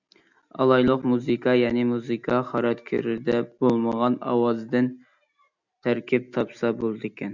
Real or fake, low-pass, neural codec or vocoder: real; 7.2 kHz; none